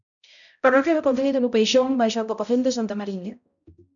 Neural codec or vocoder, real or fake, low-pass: codec, 16 kHz, 0.5 kbps, X-Codec, HuBERT features, trained on balanced general audio; fake; 7.2 kHz